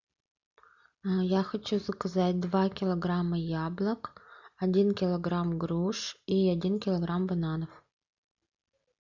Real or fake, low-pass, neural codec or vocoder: real; 7.2 kHz; none